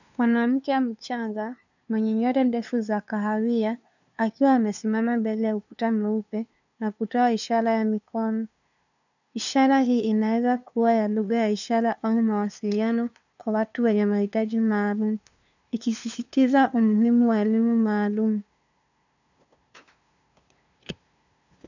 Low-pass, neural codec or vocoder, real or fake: 7.2 kHz; codec, 16 kHz, 2 kbps, FunCodec, trained on LibriTTS, 25 frames a second; fake